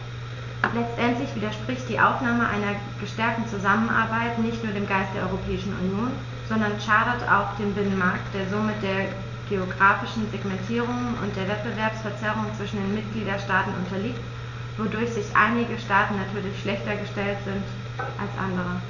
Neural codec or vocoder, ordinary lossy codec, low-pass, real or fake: none; none; 7.2 kHz; real